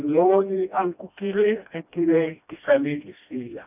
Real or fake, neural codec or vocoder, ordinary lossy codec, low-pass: fake; codec, 16 kHz, 1 kbps, FreqCodec, smaller model; none; 3.6 kHz